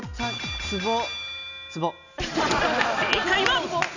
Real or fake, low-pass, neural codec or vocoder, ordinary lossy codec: real; 7.2 kHz; none; none